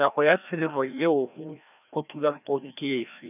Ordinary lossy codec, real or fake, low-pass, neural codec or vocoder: none; fake; 3.6 kHz; codec, 16 kHz, 1 kbps, FreqCodec, larger model